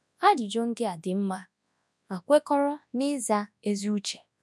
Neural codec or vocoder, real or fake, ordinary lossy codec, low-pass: codec, 24 kHz, 0.9 kbps, WavTokenizer, large speech release; fake; none; none